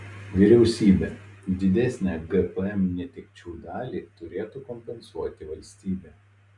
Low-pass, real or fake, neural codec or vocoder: 10.8 kHz; real; none